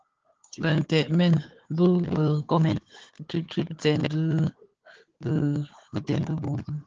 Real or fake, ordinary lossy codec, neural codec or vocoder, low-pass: fake; Opus, 16 kbps; codec, 16 kHz, 4 kbps, FunCodec, trained on Chinese and English, 50 frames a second; 7.2 kHz